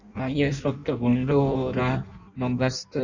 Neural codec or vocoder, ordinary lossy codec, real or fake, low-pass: codec, 16 kHz in and 24 kHz out, 0.6 kbps, FireRedTTS-2 codec; Opus, 64 kbps; fake; 7.2 kHz